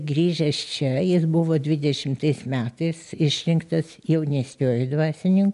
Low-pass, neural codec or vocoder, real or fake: 10.8 kHz; none; real